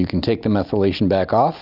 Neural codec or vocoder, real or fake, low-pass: none; real; 5.4 kHz